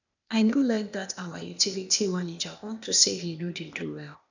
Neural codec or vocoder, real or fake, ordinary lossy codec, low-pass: codec, 16 kHz, 0.8 kbps, ZipCodec; fake; none; 7.2 kHz